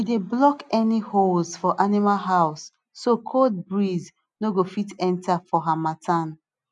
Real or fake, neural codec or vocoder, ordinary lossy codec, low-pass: real; none; none; 10.8 kHz